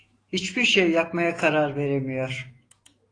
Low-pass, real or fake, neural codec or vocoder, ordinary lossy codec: 9.9 kHz; fake; autoencoder, 48 kHz, 128 numbers a frame, DAC-VAE, trained on Japanese speech; AAC, 32 kbps